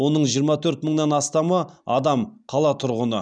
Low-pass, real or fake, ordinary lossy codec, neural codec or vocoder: 9.9 kHz; real; none; none